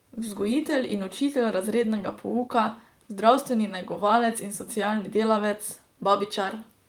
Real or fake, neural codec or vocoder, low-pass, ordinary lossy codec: fake; vocoder, 44.1 kHz, 128 mel bands, Pupu-Vocoder; 19.8 kHz; Opus, 32 kbps